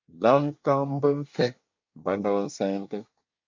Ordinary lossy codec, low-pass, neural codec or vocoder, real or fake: MP3, 48 kbps; 7.2 kHz; codec, 24 kHz, 1 kbps, SNAC; fake